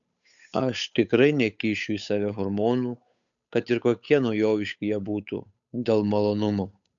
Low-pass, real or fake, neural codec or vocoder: 7.2 kHz; fake; codec, 16 kHz, 8 kbps, FunCodec, trained on Chinese and English, 25 frames a second